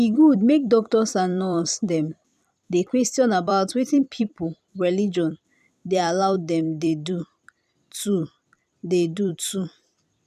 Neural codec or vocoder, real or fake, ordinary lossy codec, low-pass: vocoder, 44.1 kHz, 128 mel bands every 256 samples, BigVGAN v2; fake; none; 14.4 kHz